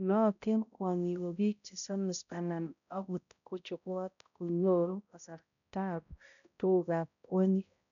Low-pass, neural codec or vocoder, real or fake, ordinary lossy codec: 7.2 kHz; codec, 16 kHz, 0.5 kbps, X-Codec, HuBERT features, trained on balanced general audio; fake; none